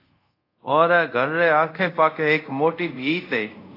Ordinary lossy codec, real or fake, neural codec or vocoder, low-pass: AAC, 32 kbps; fake; codec, 24 kHz, 0.5 kbps, DualCodec; 5.4 kHz